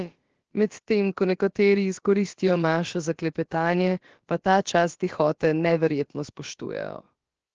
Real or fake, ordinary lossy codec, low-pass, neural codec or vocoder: fake; Opus, 16 kbps; 7.2 kHz; codec, 16 kHz, about 1 kbps, DyCAST, with the encoder's durations